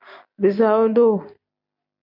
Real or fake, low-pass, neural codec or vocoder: real; 5.4 kHz; none